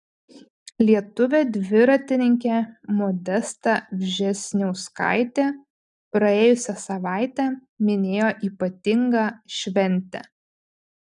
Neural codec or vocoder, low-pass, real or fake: none; 10.8 kHz; real